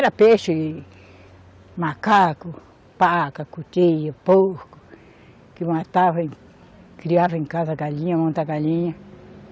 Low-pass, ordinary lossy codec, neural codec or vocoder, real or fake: none; none; none; real